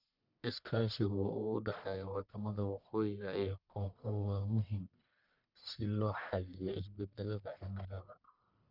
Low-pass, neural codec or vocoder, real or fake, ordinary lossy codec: 5.4 kHz; codec, 44.1 kHz, 1.7 kbps, Pupu-Codec; fake; none